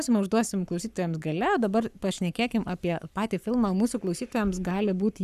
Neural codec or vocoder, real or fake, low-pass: codec, 44.1 kHz, 7.8 kbps, Pupu-Codec; fake; 14.4 kHz